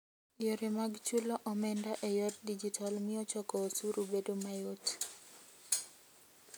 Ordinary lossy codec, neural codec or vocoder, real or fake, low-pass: none; none; real; none